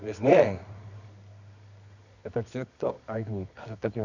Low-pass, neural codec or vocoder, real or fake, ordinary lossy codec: 7.2 kHz; codec, 24 kHz, 0.9 kbps, WavTokenizer, medium music audio release; fake; none